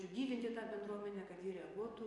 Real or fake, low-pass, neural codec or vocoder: fake; 10.8 kHz; vocoder, 24 kHz, 100 mel bands, Vocos